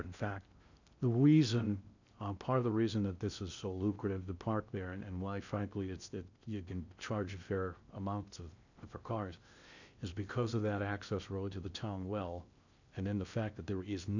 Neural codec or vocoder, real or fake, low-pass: codec, 16 kHz in and 24 kHz out, 0.6 kbps, FocalCodec, streaming, 2048 codes; fake; 7.2 kHz